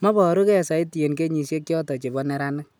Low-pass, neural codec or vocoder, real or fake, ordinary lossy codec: none; none; real; none